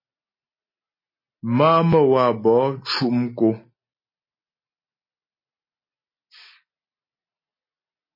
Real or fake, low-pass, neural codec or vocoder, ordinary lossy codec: real; 5.4 kHz; none; MP3, 24 kbps